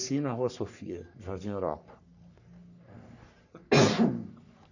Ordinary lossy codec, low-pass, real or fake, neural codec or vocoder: none; 7.2 kHz; fake; codec, 44.1 kHz, 3.4 kbps, Pupu-Codec